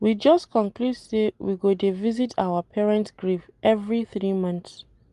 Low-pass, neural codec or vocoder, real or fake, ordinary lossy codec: 10.8 kHz; none; real; Opus, 32 kbps